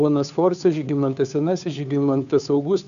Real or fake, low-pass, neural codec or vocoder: fake; 7.2 kHz; codec, 16 kHz, 2 kbps, FunCodec, trained on Chinese and English, 25 frames a second